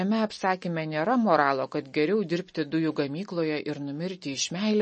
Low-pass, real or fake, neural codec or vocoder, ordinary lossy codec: 10.8 kHz; real; none; MP3, 32 kbps